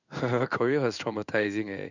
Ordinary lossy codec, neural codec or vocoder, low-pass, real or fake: none; codec, 16 kHz in and 24 kHz out, 1 kbps, XY-Tokenizer; 7.2 kHz; fake